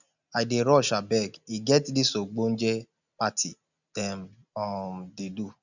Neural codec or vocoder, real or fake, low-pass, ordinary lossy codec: none; real; 7.2 kHz; none